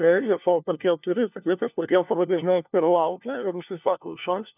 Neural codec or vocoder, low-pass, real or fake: codec, 16 kHz, 1 kbps, FunCodec, trained on LibriTTS, 50 frames a second; 3.6 kHz; fake